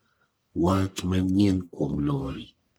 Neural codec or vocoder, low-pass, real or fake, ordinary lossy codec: codec, 44.1 kHz, 1.7 kbps, Pupu-Codec; none; fake; none